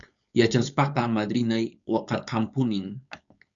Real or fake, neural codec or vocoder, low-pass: fake; codec, 16 kHz, 4.8 kbps, FACodec; 7.2 kHz